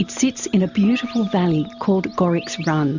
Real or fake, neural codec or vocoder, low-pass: real; none; 7.2 kHz